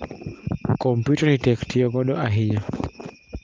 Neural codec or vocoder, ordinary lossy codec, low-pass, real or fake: none; Opus, 32 kbps; 7.2 kHz; real